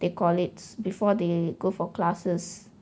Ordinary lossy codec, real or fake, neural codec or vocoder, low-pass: none; real; none; none